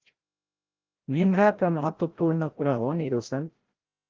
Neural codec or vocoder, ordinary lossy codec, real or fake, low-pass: codec, 16 kHz, 0.5 kbps, FreqCodec, larger model; Opus, 16 kbps; fake; 7.2 kHz